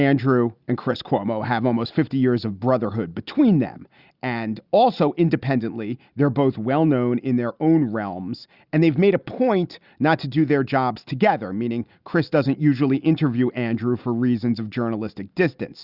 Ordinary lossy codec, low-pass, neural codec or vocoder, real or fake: Opus, 64 kbps; 5.4 kHz; none; real